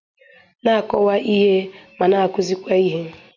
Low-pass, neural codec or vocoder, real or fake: 7.2 kHz; none; real